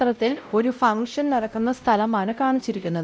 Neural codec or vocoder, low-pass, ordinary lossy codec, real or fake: codec, 16 kHz, 0.5 kbps, X-Codec, WavLM features, trained on Multilingual LibriSpeech; none; none; fake